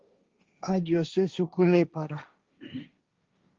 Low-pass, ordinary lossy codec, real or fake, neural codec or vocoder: 7.2 kHz; Opus, 32 kbps; fake; codec, 16 kHz, 1.1 kbps, Voila-Tokenizer